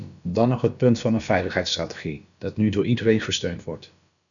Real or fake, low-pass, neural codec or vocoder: fake; 7.2 kHz; codec, 16 kHz, about 1 kbps, DyCAST, with the encoder's durations